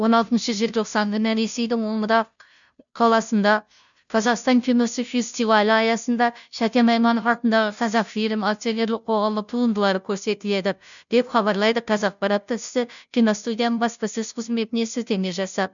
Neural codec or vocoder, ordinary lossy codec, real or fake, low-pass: codec, 16 kHz, 0.5 kbps, FunCodec, trained on Chinese and English, 25 frames a second; none; fake; 7.2 kHz